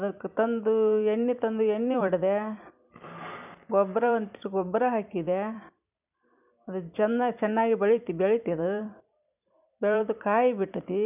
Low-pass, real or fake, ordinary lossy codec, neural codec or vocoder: 3.6 kHz; fake; none; vocoder, 44.1 kHz, 128 mel bands every 256 samples, BigVGAN v2